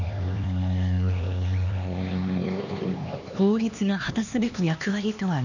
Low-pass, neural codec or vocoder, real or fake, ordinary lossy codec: 7.2 kHz; codec, 16 kHz, 2 kbps, X-Codec, HuBERT features, trained on LibriSpeech; fake; none